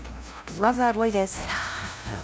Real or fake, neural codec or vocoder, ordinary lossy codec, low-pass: fake; codec, 16 kHz, 0.5 kbps, FunCodec, trained on LibriTTS, 25 frames a second; none; none